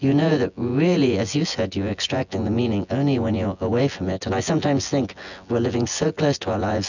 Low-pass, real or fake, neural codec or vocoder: 7.2 kHz; fake; vocoder, 24 kHz, 100 mel bands, Vocos